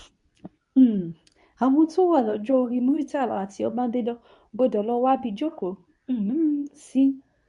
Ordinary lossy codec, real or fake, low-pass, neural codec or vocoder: none; fake; 10.8 kHz; codec, 24 kHz, 0.9 kbps, WavTokenizer, medium speech release version 2